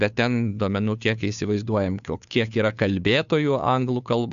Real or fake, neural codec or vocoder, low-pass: fake; codec, 16 kHz, 2 kbps, FunCodec, trained on Chinese and English, 25 frames a second; 7.2 kHz